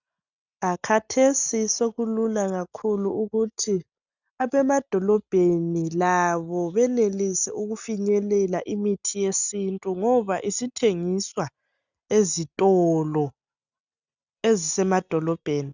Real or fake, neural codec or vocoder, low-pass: real; none; 7.2 kHz